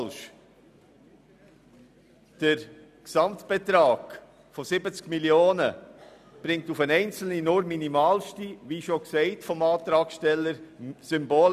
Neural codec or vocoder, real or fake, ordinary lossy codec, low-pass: none; real; none; 14.4 kHz